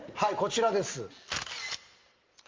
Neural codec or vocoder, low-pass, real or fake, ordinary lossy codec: none; 7.2 kHz; real; Opus, 32 kbps